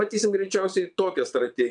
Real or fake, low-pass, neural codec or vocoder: fake; 9.9 kHz; vocoder, 22.05 kHz, 80 mel bands, WaveNeXt